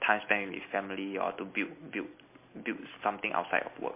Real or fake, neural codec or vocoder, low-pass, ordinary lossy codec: real; none; 3.6 kHz; MP3, 24 kbps